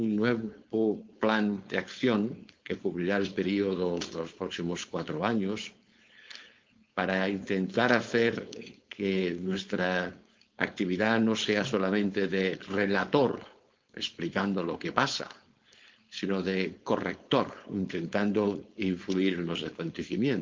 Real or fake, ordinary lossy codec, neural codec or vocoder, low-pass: fake; Opus, 32 kbps; codec, 16 kHz, 4.8 kbps, FACodec; 7.2 kHz